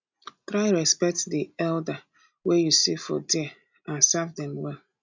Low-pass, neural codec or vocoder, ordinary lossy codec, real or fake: 7.2 kHz; none; MP3, 64 kbps; real